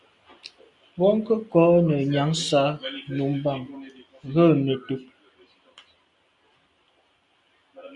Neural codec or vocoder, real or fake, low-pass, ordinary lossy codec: none; real; 10.8 kHz; Opus, 64 kbps